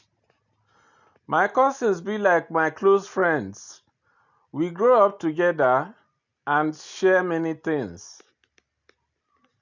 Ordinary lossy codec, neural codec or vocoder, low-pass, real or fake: none; none; 7.2 kHz; real